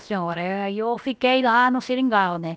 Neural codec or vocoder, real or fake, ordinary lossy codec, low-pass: codec, 16 kHz, about 1 kbps, DyCAST, with the encoder's durations; fake; none; none